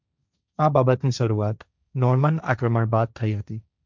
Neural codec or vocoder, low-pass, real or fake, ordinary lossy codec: codec, 16 kHz, 1.1 kbps, Voila-Tokenizer; 7.2 kHz; fake; none